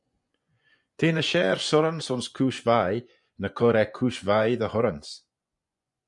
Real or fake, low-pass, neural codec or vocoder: fake; 10.8 kHz; vocoder, 24 kHz, 100 mel bands, Vocos